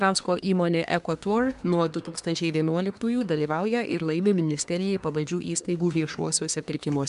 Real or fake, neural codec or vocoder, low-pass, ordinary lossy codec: fake; codec, 24 kHz, 1 kbps, SNAC; 10.8 kHz; MP3, 96 kbps